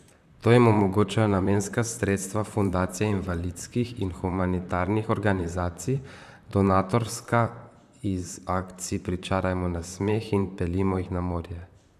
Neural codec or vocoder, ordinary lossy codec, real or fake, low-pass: vocoder, 44.1 kHz, 128 mel bands, Pupu-Vocoder; none; fake; 14.4 kHz